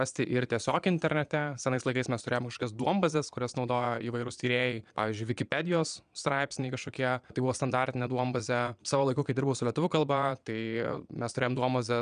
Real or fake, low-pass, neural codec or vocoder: fake; 9.9 kHz; vocoder, 22.05 kHz, 80 mel bands, Vocos